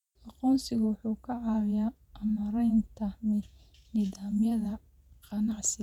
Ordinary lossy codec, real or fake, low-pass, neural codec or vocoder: none; fake; 19.8 kHz; vocoder, 48 kHz, 128 mel bands, Vocos